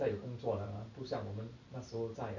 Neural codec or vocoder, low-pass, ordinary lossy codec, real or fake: none; 7.2 kHz; none; real